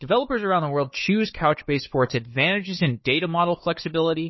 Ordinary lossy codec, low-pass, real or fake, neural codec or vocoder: MP3, 24 kbps; 7.2 kHz; fake; codec, 16 kHz, 4 kbps, FunCodec, trained on Chinese and English, 50 frames a second